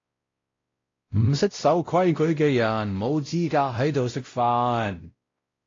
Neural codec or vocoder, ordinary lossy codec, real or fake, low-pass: codec, 16 kHz, 0.5 kbps, X-Codec, WavLM features, trained on Multilingual LibriSpeech; AAC, 32 kbps; fake; 7.2 kHz